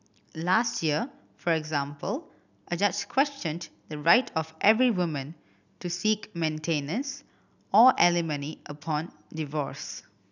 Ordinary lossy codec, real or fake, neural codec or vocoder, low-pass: none; real; none; 7.2 kHz